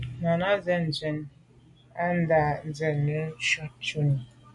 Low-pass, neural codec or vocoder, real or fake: 10.8 kHz; none; real